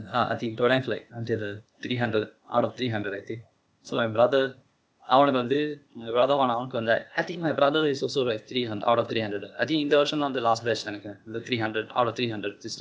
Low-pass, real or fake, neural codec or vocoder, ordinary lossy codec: none; fake; codec, 16 kHz, 0.8 kbps, ZipCodec; none